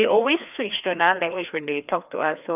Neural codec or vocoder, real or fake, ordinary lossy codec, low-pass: codec, 16 kHz, 2 kbps, FreqCodec, larger model; fake; none; 3.6 kHz